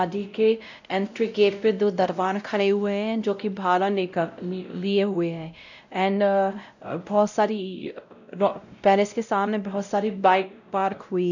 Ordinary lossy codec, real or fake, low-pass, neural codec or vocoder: none; fake; 7.2 kHz; codec, 16 kHz, 0.5 kbps, X-Codec, WavLM features, trained on Multilingual LibriSpeech